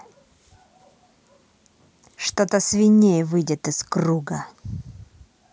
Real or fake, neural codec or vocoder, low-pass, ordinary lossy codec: real; none; none; none